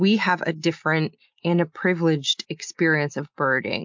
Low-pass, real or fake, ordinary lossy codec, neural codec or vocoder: 7.2 kHz; real; MP3, 64 kbps; none